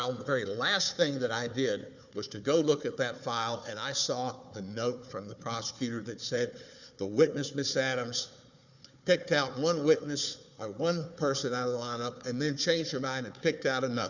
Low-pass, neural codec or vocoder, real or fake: 7.2 kHz; codec, 16 kHz, 4 kbps, FunCodec, trained on Chinese and English, 50 frames a second; fake